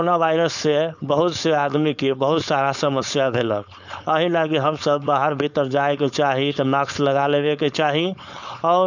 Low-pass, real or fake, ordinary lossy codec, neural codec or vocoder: 7.2 kHz; fake; none; codec, 16 kHz, 4.8 kbps, FACodec